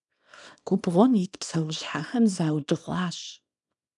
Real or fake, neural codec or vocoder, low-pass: fake; codec, 24 kHz, 0.9 kbps, WavTokenizer, small release; 10.8 kHz